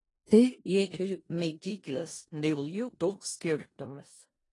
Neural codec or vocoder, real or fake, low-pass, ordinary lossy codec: codec, 16 kHz in and 24 kHz out, 0.4 kbps, LongCat-Audio-Codec, four codebook decoder; fake; 10.8 kHz; AAC, 32 kbps